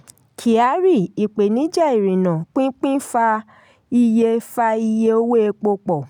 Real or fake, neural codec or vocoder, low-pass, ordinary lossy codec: real; none; 19.8 kHz; none